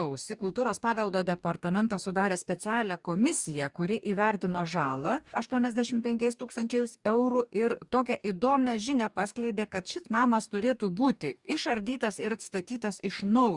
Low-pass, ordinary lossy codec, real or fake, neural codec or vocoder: 10.8 kHz; Opus, 64 kbps; fake; codec, 44.1 kHz, 2.6 kbps, DAC